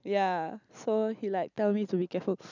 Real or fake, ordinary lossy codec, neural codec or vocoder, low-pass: real; none; none; 7.2 kHz